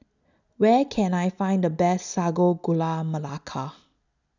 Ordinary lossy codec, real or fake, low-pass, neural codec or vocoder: none; real; 7.2 kHz; none